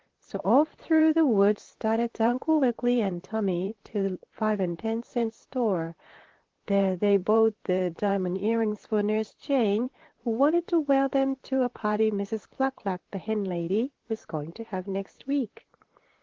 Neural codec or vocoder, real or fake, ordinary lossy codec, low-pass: vocoder, 44.1 kHz, 128 mel bands, Pupu-Vocoder; fake; Opus, 16 kbps; 7.2 kHz